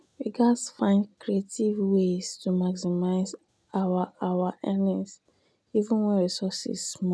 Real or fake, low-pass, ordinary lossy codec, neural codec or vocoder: real; none; none; none